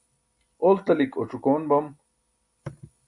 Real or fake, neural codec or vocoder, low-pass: real; none; 10.8 kHz